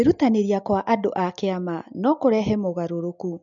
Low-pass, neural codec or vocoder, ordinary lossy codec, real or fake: 7.2 kHz; none; MP3, 64 kbps; real